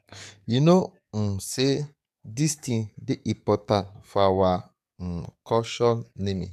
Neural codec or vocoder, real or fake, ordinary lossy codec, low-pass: codec, 44.1 kHz, 7.8 kbps, DAC; fake; AAC, 96 kbps; 14.4 kHz